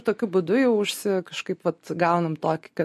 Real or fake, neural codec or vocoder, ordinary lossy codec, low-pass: real; none; MP3, 64 kbps; 14.4 kHz